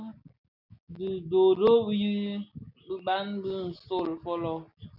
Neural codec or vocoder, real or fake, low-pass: none; real; 5.4 kHz